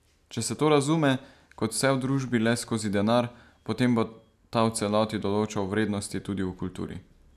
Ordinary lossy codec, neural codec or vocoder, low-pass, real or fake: none; none; 14.4 kHz; real